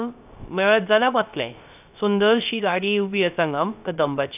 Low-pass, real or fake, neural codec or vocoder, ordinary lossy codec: 3.6 kHz; fake; codec, 16 kHz, 0.3 kbps, FocalCodec; none